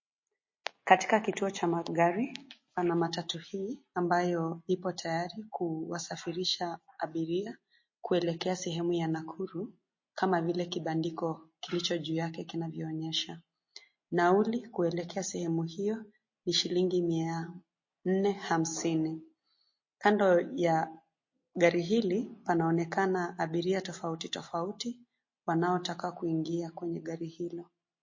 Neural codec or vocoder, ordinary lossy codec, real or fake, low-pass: none; MP3, 32 kbps; real; 7.2 kHz